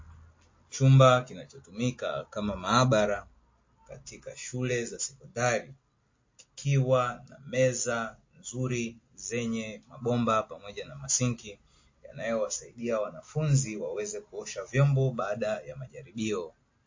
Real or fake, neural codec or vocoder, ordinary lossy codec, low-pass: real; none; MP3, 32 kbps; 7.2 kHz